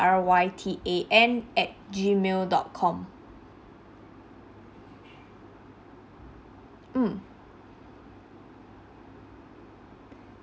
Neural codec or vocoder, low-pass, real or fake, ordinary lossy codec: none; none; real; none